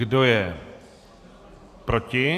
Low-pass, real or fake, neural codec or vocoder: 14.4 kHz; fake; vocoder, 44.1 kHz, 128 mel bands every 512 samples, BigVGAN v2